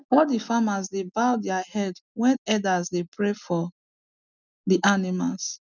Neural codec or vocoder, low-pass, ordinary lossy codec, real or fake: none; 7.2 kHz; none; real